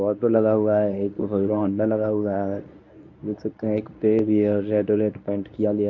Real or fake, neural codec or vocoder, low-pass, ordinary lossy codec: fake; codec, 24 kHz, 0.9 kbps, WavTokenizer, medium speech release version 1; 7.2 kHz; none